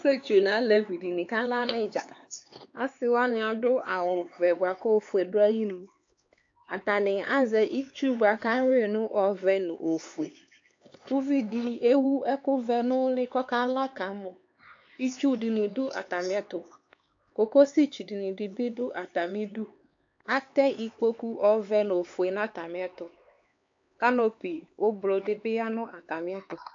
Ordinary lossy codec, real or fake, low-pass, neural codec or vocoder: AAC, 48 kbps; fake; 7.2 kHz; codec, 16 kHz, 4 kbps, X-Codec, HuBERT features, trained on LibriSpeech